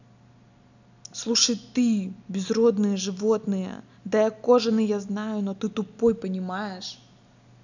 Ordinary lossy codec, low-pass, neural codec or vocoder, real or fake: none; 7.2 kHz; none; real